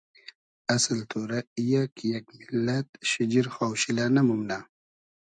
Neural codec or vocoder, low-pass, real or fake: none; 9.9 kHz; real